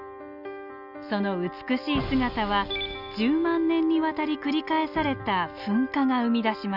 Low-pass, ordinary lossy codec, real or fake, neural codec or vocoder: 5.4 kHz; none; real; none